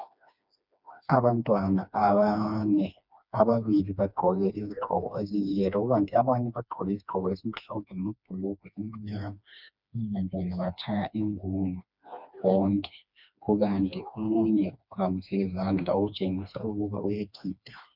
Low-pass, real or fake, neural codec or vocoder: 5.4 kHz; fake; codec, 16 kHz, 2 kbps, FreqCodec, smaller model